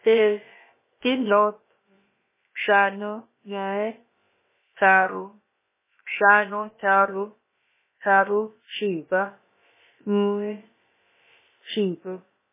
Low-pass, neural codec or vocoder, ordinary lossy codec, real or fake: 3.6 kHz; codec, 16 kHz, about 1 kbps, DyCAST, with the encoder's durations; MP3, 16 kbps; fake